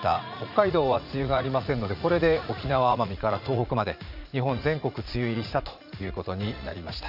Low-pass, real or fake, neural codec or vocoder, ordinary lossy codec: 5.4 kHz; fake; vocoder, 44.1 kHz, 80 mel bands, Vocos; none